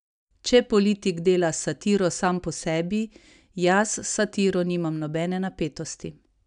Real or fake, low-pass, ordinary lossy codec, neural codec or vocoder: real; 9.9 kHz; none; none